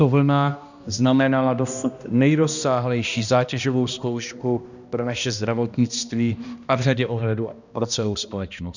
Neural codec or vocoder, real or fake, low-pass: codec, 16 kHz, 1 kbps, X-Codec, HuBERT features, trained on balanced general audio; fake; 7.2 kHz